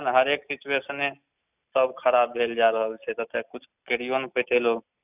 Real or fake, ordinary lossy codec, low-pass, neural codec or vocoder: real; none; 3.6 kHz; none